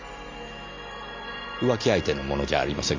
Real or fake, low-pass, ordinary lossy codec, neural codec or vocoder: real; 7.2 kHz; MP3, 48 kbps; none